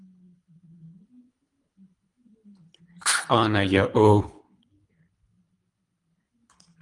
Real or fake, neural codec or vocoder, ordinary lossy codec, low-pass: fake; codec, 24 kHz, 3 kbps, HILCodec; Opus, 32 kbps; 10.8 kHz